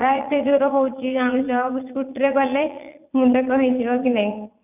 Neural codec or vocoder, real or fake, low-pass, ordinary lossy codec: vocoder, 22.05 kHz, 80 mel bands, Vocos; fake; 3.6 kHz; none